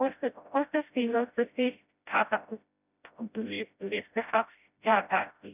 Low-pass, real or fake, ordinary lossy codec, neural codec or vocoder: 3.6 kHz; fake; none; codec, 16 kHz, 0.5 kbps, FreqCodec, smaller model